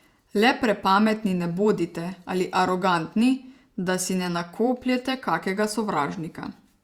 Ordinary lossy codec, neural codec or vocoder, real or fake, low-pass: Opus, 64 kbps; vocoder, 48 kHz, 128 mel bands, Vocos; fake; 19.8 kHz